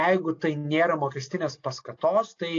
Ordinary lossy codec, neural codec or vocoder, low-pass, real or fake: AAC, 48 kbps; none; 7.2 kHz; real